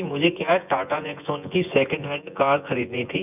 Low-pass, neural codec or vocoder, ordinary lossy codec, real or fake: 3.6 kHz; vocoder, 24 kHz, 100 mel bands, Vocos; none; fake